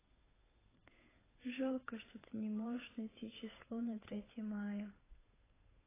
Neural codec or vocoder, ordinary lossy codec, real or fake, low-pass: codec, 16 kHz, 8 kbps, FunCodec, trained on Chinese and English, 25 frames a second; AAC, 16 kbps; fake; 3.6 kHz